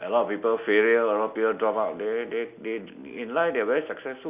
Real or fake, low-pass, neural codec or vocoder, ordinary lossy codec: real; 3.6 kHz; none; none